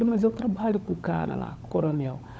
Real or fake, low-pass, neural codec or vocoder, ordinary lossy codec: fake; none; codec, 16 kHz, 16 kbps, FunCodec, trained on LibriTTS, 50 frames a second; none